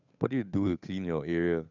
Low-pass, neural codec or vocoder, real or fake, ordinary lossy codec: 7.2 kHz; codec, 16 kHz, 8 kbps, FunCodec, trained on Chinese and English, 25 frames a second; fake; none